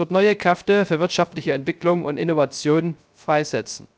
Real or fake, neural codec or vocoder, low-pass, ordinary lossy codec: fake; codec, 16 kHz, 0.3 kbps, FocalCodec; none; none